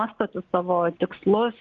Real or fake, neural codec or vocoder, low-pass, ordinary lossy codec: real; none; 7.2 kHz; Opus, 24 kbps